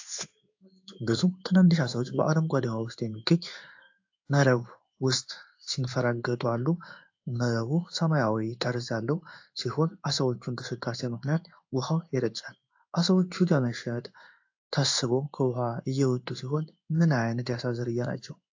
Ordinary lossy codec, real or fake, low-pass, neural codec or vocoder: AAC, 48 kbps; fake; 7.2 kHz; codec, 16 kHz in and 24 kHz out, 1 kbps, XY-Tokenizer